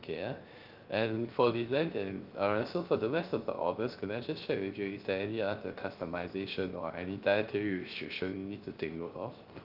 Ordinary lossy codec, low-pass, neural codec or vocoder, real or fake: Opus, 32 kbps; 5.4 kHz; codec, 16 kHz, 0.3 kbps, FocalCodec; fake